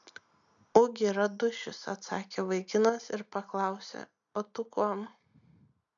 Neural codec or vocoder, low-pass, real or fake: none; 7.2 kHz; real